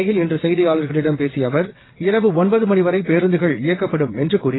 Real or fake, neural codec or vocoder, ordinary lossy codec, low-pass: fake; vocoder, 22.05 kHz, 80 mel bands, WaveNeXt; AAC, 16 kbps; 7.2 kHz